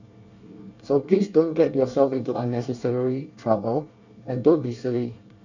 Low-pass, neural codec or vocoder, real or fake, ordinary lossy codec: 7.2 kHz; codec, 24 kHz, 1 kbps, SNAC; fake; none